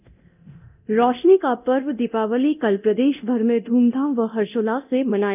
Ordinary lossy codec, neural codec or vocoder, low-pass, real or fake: none; codec, 24 kHz, 0.9 kbps, DualCodec; 3.6 kHz; fake